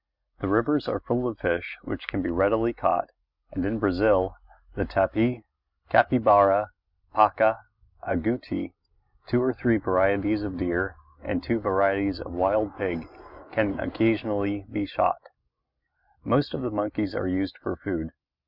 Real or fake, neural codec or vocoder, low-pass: real; none; 5.4 kHz